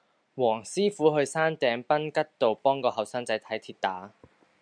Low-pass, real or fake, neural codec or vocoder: 9.9 kHz; real; none